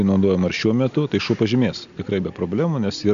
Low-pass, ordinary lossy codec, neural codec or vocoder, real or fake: 7.2 kHz; AAC, 96 kbps; none; real